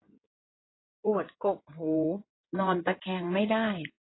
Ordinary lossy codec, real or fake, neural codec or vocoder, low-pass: AAC, 16 kbps; fake; codec, 16 kHz in and 24 kHz out, 2.2 kbps, FireRedTTS-2 codec; 7.2 kHz